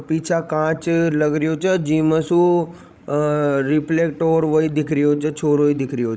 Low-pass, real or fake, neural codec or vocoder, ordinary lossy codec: none; fake; codec, 16 kHz, 16 kbps, FreqCodec, larger model; none